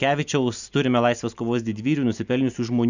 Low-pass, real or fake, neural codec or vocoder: 7.2 kHz; real; none